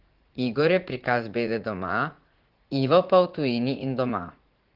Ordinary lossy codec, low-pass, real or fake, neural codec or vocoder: Opus, 16 kbps; 5.4 kHz; fake; vocoder, 44.1 kHz, 80 mel bands, Vocos